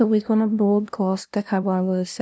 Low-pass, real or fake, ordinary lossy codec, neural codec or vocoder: none; fake; none; codec, 16 kHz, 0.5 kbps, FunCodec, trained on LibriTTS, 25 frames a second